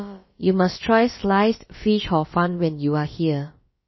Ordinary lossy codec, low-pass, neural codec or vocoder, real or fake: MP3, 24 kbps; 7.2 kHz; codec, 16 kHz, about 1 kbps, DyCAST, with the encoder's durations; fake